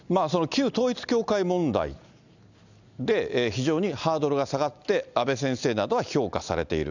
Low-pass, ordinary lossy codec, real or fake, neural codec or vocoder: 7.2 kHz; none; real; none